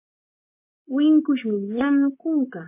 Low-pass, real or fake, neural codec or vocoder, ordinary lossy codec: 3.6 kHz; fake; vocoder, 44.1 kHz, 128 mel bands, Pupu-Vocoder; MP3, 24 kbps